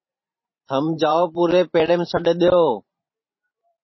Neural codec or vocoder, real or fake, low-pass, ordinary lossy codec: vocoder, 44.1 kHz, 128 mel bands every 512 samples, BigVGAN v2; fake; 7.2 kHz; MP3, 24 kbps